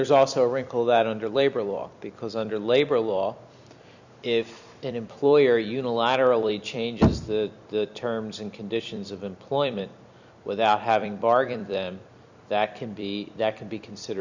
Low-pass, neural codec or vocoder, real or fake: 7.2 kHz; vocoder, 44.1 kHz, 128 mel bands every 256 samples, BigVGAN v2; fake